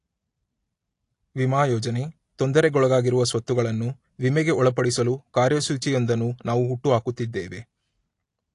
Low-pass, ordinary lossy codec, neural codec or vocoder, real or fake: 10.8 kHz; AAC, 48 kbps; none; real